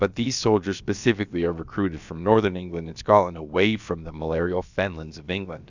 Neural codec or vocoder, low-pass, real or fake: codec, 16 kHz, about 1 kbps, DyCAST, with the encoder's durations; 7.2 kHz; fake